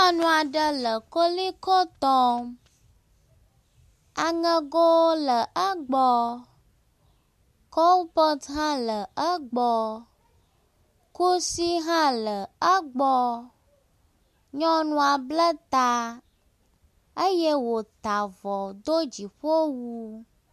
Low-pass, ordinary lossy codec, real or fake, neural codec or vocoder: 14.4 kHz; MP3, 64 kbps; real; none